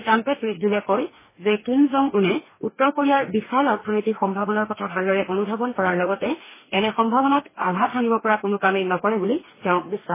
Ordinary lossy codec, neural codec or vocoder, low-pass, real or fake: MP3, 16 kbps; codec, 44.1 kHz, 2.6 kbps, DAC; 3.6 kHz; fake